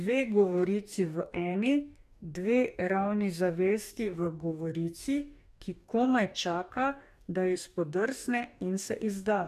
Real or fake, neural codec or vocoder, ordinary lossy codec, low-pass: fake; codec, 44.1 kHz, 2.6 kbps, DAC; none; 14.4 kHz